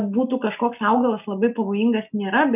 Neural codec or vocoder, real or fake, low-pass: none; real; 3.6 kHz